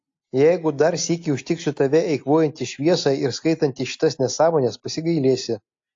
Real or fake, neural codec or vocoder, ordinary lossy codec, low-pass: real; none; AAC, 48 kbps; 7.2 kHz